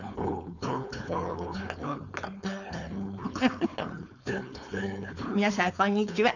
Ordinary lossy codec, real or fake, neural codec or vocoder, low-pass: none; fake; codec, 16 kHz, 4.8 kbps, FACodec; 7.2 kHz